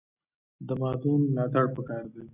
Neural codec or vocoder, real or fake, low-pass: none; real; 3.6 kHz